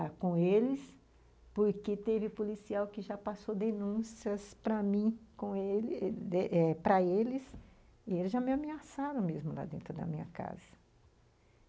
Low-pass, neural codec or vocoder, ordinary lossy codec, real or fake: none; none; none; real